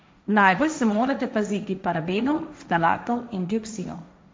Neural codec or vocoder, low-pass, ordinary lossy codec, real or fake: codec, 16 kHz, 1.1 kbps, Voila-Tokenizer; none; none; fake